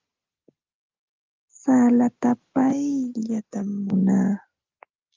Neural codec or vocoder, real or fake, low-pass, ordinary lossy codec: none; real; 7.2 kHz; Opus, 24 kbps